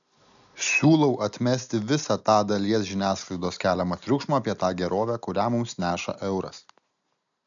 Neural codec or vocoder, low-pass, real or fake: none; 7.2 kHz; real